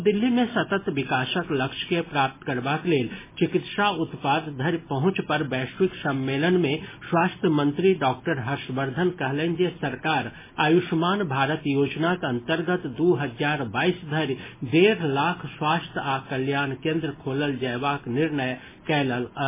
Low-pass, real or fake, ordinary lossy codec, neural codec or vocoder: 3.6 kHz; real; MP3, 16 kbps; none